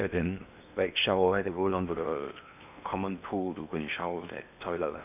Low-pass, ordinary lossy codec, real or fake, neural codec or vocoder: 3.6 kHz; none; fake; codec, 16 kHz in and 24 kHz out, 0.8 kbps, FocalCodec, streaming, 65536 codes